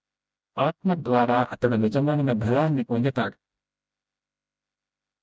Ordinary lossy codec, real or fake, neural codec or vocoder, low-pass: none; fake; codec, 16 kHz, 0.5 kbps, FreqCodec, smaller model; none